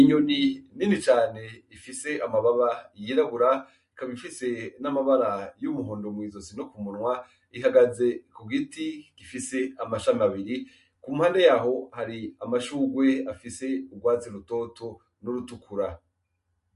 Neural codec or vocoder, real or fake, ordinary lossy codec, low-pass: none; real; MP3, 48 kbps; 14.4 kHz